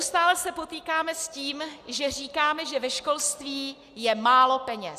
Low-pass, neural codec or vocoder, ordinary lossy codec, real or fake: 14.4 kHz; none; Opus, 64 kbps; real